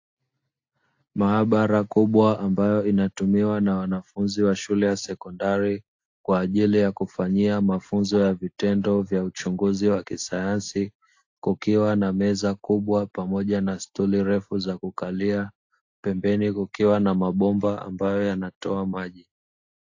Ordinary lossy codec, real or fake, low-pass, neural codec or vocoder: AAC, 48 kbps; real; 7.2 kHz; none